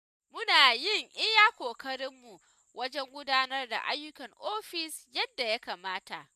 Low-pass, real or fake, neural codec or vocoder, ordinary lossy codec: 14.4 kHz; real; none; none